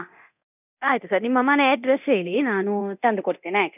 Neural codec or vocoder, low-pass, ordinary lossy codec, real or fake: codec, 24 kHz, 0.9 kbps, DualCodec; 3.6 kHz; none; fake